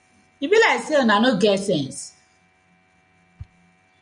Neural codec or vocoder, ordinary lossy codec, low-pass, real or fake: none; MP3, 96 kbps; 9.9 kHz; real